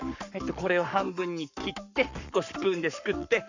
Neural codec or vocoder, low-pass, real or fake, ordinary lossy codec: codec, 44.1 kHz, 7.8 kbps, Pupu-Codec; 7.2 kHz; fake; none